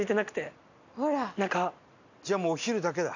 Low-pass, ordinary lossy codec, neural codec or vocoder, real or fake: 7.2 kHz; none; none; real